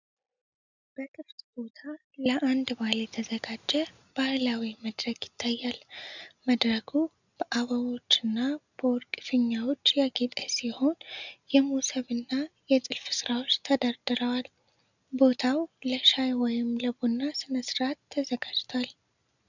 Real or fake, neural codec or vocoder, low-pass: real; none; 7.2 kHz